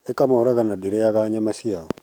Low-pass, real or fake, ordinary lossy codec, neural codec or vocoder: 19.8 kHz; fake; none; codec, 44.1 kHz, 7.8 kbps, DAC